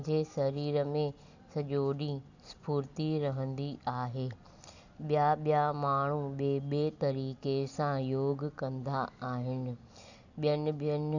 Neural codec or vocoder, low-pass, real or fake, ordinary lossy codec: none; 7.2 kHz; real; none